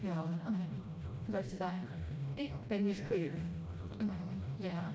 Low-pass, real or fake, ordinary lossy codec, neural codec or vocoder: none; fake; none; codec, 16 kHz, 0.5 kbps, FreqCodec, smaller model